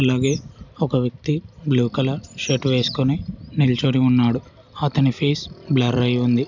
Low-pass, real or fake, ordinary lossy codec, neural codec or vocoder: 7.2 kHz; real; none; none